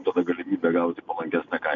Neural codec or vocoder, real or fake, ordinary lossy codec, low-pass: none; real; MP3, 96 kbps; 7.2 kHz